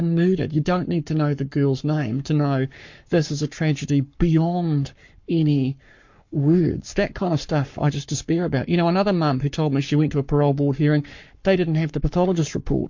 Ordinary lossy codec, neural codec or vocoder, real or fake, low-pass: MP3, 48 kbps; codec, 44.1 kHz, 7.8 kbps, Pupu-Codec; fake; 7.2 kHz